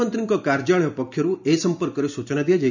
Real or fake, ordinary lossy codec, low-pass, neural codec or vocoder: real; none; 7.2 kHz; none